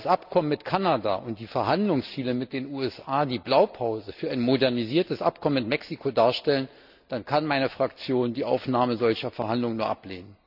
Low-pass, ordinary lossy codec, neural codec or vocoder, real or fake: 5.4 kHz; none; none; real